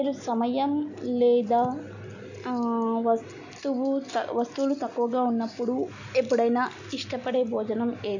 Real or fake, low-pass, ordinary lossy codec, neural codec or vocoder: real; 7.2 kHz; none; none